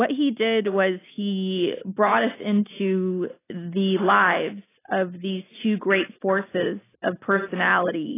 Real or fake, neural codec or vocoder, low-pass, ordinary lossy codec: real; none; 3.6 kHz; AAC, 16 kbps